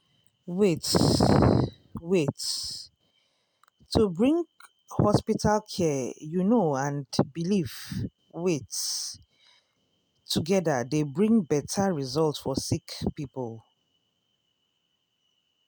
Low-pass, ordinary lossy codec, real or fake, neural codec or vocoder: none; none; real; none